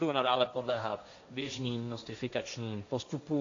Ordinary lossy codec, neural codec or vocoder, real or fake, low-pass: AAC, 48 kbps; codec, 16 kHz, 1.1 kbps, Voila-Tokenizer; fake; 7.2 kHz